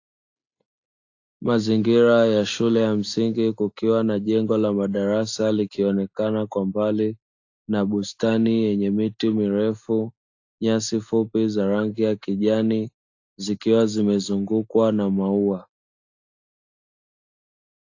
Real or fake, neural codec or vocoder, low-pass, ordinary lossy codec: real; none; 7.2 kHz; AAC, 48 kbps